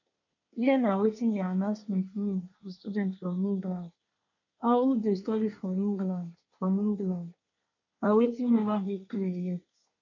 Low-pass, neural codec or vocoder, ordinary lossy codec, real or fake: 7.2 kHz; codec, 24 kHz, 1 kbps, SNAC; none; fake